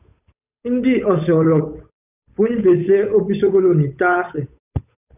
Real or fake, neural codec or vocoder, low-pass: fake; codec, 16 kHz, 8 kbps, FunCodec, trained on Chinese and English, 25 frames a second; 3.6 kHz